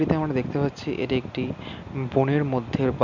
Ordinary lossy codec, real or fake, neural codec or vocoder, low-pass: none; real; none; 7.2 kHz